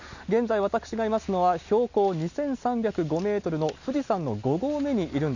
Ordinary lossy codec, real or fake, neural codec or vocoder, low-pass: none; real; none; 7.2 kHz